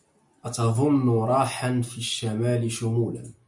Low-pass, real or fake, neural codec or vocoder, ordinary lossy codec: 10.8 kHz; real; none; MP3, 64 kbps